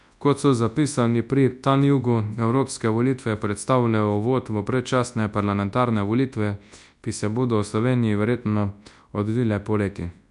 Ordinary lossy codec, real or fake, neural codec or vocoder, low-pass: none; fake; codec, 24 kHz, 0.9 kbps, WavTokenizer, large speech release; 10.8 kHz